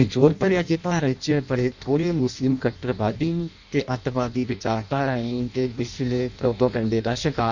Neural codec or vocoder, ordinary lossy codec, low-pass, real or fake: codec, 16 kHz in and 24 kHz out, 0.6 kbps, FireRedTTS-2 codec; none; 7.2 kHz; fake